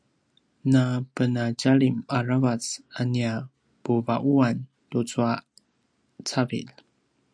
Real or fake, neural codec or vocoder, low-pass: real; none; 9.9 kHz